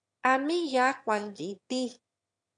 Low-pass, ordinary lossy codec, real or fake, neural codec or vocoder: 9.9 kHz; AAC, 64 kbps; fake; autoencoder, 22.05 kHz, a latent of 192 numbers a frame, VITS, trained on one speaker